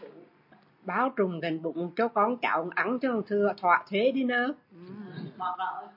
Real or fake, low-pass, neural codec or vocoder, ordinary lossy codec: real; 5.4 kHz; none; MP3, 32 kbps